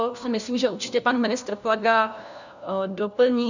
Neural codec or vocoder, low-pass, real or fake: codec, 16 kHz, 1 kbps, FunCodec, trained on LibriTTS, 50 frames a second; 7.2 kHz; fake